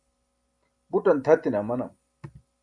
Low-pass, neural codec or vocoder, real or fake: 9.9 kHz; none; real